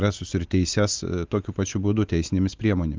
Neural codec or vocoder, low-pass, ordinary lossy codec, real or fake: none; 7.2 kHz; Opus, 32 kbps; real